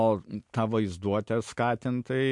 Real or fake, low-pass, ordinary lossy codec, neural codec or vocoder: real; 10.8 kHz; MP3, 64 kbps; none